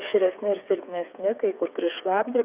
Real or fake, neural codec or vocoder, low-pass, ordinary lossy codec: fake; codec, 16 kHz, 4 kbps, FreqCodec, smaller model; 3.6 kHz; Opus, 64 kbps